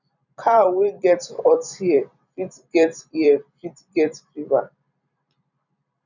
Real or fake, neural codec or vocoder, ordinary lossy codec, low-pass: fake; vocoder, 44.1 kHz, 128 mel bands every 256 samples, BigVGAN v2; none; 7.2 kHz